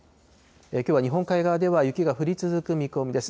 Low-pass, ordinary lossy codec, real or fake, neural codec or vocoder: none; none; real; none